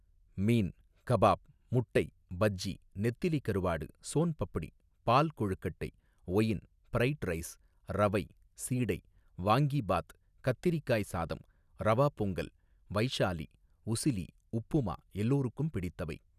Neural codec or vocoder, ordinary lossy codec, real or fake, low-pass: none; none; real; 14.4 kHz